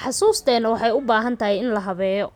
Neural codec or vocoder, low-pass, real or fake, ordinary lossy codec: none; 19.8 kHz; real; none